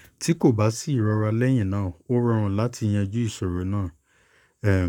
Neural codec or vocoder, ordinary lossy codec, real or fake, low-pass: codec, 44.1 kHz, 7.8 kbps, Pupu-Codec; none; fake; 19.8 kHz